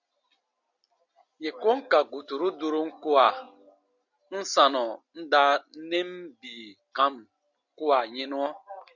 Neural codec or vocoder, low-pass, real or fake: none; 7.2 kHz; real